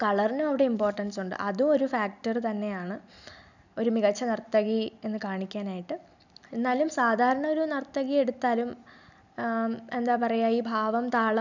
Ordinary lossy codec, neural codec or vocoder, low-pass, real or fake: none; none; 7.2 kHz; real